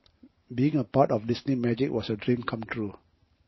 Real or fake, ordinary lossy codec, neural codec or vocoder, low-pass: fake; MP3, 24 kbps; vocoder, 44.1 kHz, 128 mel bands every 512 samples, BigVGAN v2; 7.2 kHz